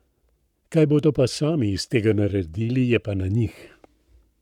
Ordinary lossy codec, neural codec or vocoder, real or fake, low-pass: none; codec, 44.1 kHz, 7.8 kbps, Pupu-Codec; fake; 19.8 kHz